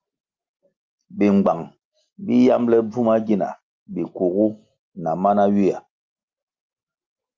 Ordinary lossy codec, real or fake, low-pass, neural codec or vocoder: Opus, 24 kbps; real; 7.2 kHz; none